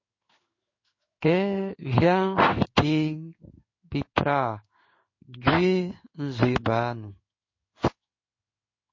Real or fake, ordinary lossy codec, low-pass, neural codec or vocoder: fake; MP3, 32 kbps; 7.2 kHz; codec, 16 kHz in and 24 kHz out, 1 kbps, XY-Tokenizer